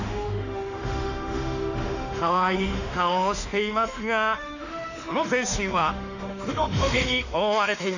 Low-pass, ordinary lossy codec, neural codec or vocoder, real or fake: 7.2 kHz; none; autoencoder, 48 kHz, 32 numbers a frame, DAC-VAE, trained on Japanese speech; fake